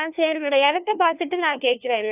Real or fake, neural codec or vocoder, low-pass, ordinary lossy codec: fake; codec, 16 kHz, 1 kbps, FunCodec, trained on LibriTTS, 50 frames a second; 3.6 kHz; none